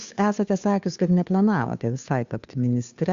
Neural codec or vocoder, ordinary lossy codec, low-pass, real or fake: codec, 16 kHz, 2 kbps, FunCodec, trained on Chinese and English, 25 frames a second; Opus, 64 kbps; 7.2 kHz; fake